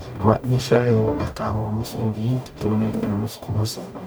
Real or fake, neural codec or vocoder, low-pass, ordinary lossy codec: fake; codec, 44.1 kHz, 0.9 kbps, DAC; none; none